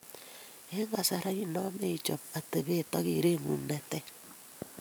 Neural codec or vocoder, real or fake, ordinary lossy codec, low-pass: vocoder, 44.1 kHz, 128 mel bands every 512 samples, BigVGAN v2; fake; none; none